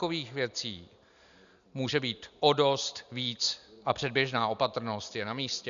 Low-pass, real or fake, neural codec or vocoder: 7.2 kHz; real; none